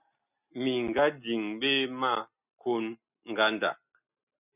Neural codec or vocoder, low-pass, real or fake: none; 3.6 kHz; real